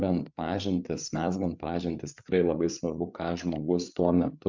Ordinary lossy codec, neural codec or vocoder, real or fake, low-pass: MP3, 64 kbps; codec, 16 kHz, 8 kbps, FreqCodec, larger model; fake; 7.2 kHz